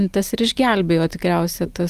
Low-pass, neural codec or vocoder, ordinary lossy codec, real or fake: 14.4 kHz; none; Opus, 32 kbps; real